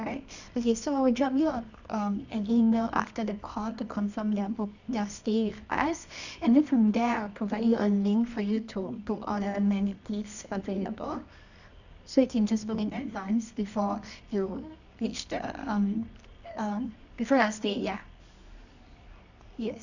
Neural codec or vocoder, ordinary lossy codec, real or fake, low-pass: codec, 24 kHz, 0.9 kbps, WavTokenizer, medium music audio release; none; fake; 7.2 kHz